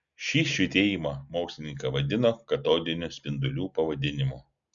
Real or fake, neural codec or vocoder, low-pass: real; none; 7.2 kHz